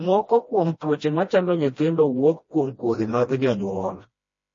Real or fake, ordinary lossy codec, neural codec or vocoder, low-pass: fake; MP3, 32 kbps; codec, 16 kHz, 1 kbps, FreqCodec, smaller model; 7.2 kHz